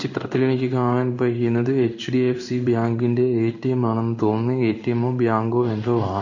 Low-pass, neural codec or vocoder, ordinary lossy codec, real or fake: 7.2 kHz; codec, 16 kHz in and 24 kHz out, 1 kbps, XY-Tokenizer; none; fake